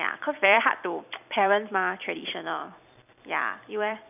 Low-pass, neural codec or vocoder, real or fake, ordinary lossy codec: 3.6 kHz; vocoder, 44.1 kHz, 128 mel bands every 256 samples, BigVGAN v2; fake; none